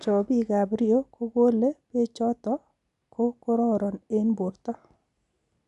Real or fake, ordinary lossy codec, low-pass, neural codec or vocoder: real; none; 10.8 kHz; none